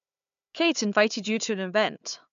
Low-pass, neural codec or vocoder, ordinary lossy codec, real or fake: 7.2 kHz; codec, 16 kHz, 4 kbps, FunCodec, trained on Chinese and English, 50 frames a second; MP3, 64 kbps; fake